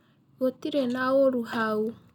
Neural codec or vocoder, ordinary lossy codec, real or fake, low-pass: none; none; real; 19.8 kHz